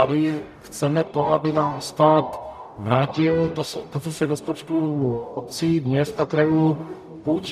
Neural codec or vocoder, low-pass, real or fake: codec, 44.1 kHz, 0.9 kbps, DAC; 14.4 kHz; fake